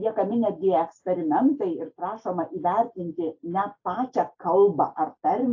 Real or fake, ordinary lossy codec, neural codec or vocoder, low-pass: real; AAC, 48 kbps; none; 7.2 kHz